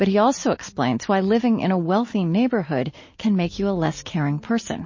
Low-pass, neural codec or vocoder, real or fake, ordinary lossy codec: 7.2 kHz; none; real; MP3, 32 kbps